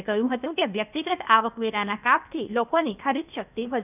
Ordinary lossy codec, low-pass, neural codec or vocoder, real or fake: none; 3.6 kHz; codec, 16 kHz, 0.8 kbps, ZipCodec; fake